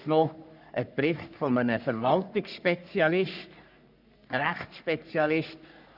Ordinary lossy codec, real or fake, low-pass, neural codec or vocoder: none; fake; 5.4 kHz; codec, 44.1 kHz, 3.4 kbps, Pupu-Codec